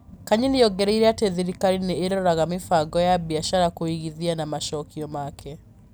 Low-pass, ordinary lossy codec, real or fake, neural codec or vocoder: none; none; real; none